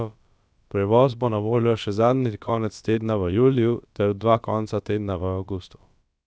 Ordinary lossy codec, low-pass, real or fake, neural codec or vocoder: none; none; fake; codec, 16 kHz, about 1 kbps, DyCAST, with the encoder's durations